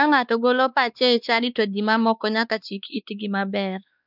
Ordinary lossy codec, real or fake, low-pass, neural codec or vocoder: none; fake; 5.4 kHz; codec, 16 kHz, 2 kbps, X-Codec, WavLM features, trained on Multilingual LibriSpeech